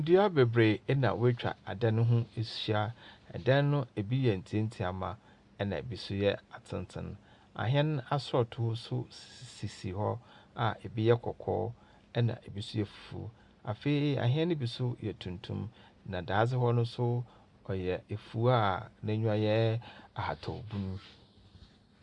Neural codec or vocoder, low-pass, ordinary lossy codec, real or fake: none; 10.8 kHz; Opus, 64 kbps; real